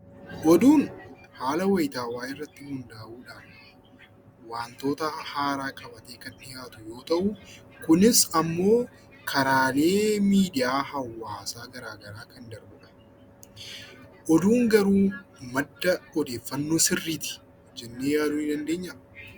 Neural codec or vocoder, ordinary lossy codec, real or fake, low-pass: none; Opus, 64 kbps; real; 19.8 kHz